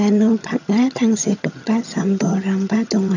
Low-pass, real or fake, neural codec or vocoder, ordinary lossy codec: 7.2 kHz; fake; vocoder, 22.05 kHz, 80 mel bands, HiFi-GAN; none